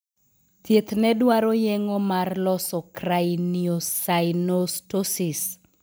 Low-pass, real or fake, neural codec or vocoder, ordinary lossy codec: none; real; none; none